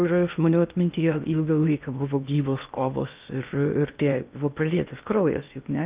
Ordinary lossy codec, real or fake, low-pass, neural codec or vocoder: Opus, 24 kbps; fake; 3.6 kHz; codec, 16 kHz in and 24 kHz out, 0.8 kbps, FocalCodec, streaming, 65536 codes